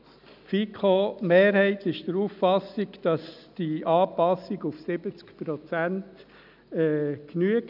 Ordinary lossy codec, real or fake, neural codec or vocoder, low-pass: none; real; none; 5.4 kHz